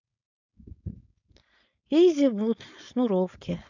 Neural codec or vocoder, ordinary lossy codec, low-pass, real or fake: codec, 16 kHz, 4.8 kbps, FACodec; none; 7.2 kHz; fake